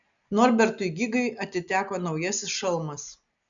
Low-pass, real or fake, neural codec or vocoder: 7.2 kHz; real; none